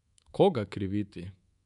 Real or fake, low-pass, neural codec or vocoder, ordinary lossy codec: fake; 10.8 kHz; codec, 24 kHz, 3.1 kbps, DualCodec; none